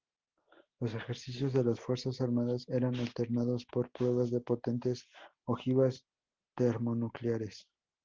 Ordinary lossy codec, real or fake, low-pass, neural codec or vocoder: Opus, 16 kbps; real; 7.2 kHz; none